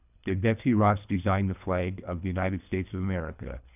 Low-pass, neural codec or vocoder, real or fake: 3.6 kHz; codec, 24 kHz, 3 kbps, HILCodec; fake